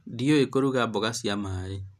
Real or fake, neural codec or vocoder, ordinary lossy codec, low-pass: real; none; none; 14.4 kHz